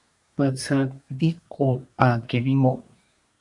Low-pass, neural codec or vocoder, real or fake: 10.8 kHz; codec, 24 kHz, 1 kbps, SNAC; fake